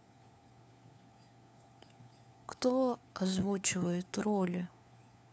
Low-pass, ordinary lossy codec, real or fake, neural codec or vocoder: none; none; fake; codec, 16 kHz, 4 kbps, FunCodec, trained on LibriTTS, 50 frames a second